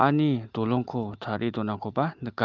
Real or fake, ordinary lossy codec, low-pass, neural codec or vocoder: real; Opus, 32 kbps; 7.2 kHz; none